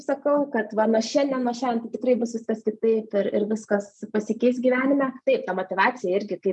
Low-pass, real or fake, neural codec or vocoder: 10.8 kHz; real; none